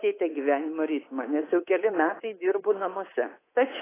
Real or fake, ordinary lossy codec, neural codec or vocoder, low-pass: fake; AAC, 16 kbps; codec, 24 kHz, 3.1 kbps, DualCodec; 3.6 kHz